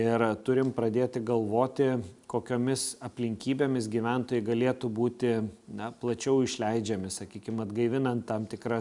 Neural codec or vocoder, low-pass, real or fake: none; 10.8 kHz; real